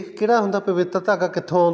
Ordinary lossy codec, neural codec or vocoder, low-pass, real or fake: none; none; none; real